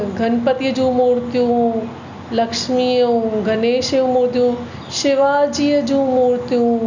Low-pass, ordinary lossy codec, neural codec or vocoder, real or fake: 7.2 kHz; none; none; real